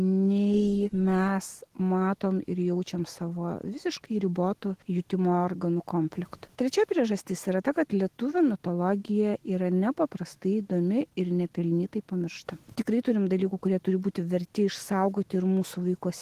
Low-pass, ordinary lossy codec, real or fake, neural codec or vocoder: 14.4 kHz; Opus, 16 kbps; fake; autoencoder, 48 kHz, 128 numbers a frame, DAC-VAE, trained on Japanese speech